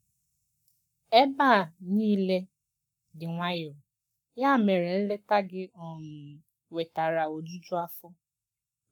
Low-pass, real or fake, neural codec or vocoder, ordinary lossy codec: 19.8 kHz; fake; codec, 44.1 kHz, 7.8 kbps, Pupu-Codec; none